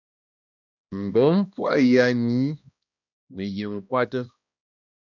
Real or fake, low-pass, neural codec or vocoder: fake; 7.2 kHz; codec, 16 kHz, 1 kbps, X-Codec, HuBERT features, trained on balanced general audio